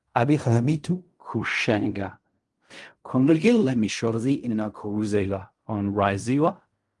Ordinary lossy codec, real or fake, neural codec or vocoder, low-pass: Opus, 32 kbps; fake; codec, 16 kHz in and 24 kHz out, 0.4 kbps, LongCat-Audio-Codec, fine tuned four codebook decoder; 10.8 kHz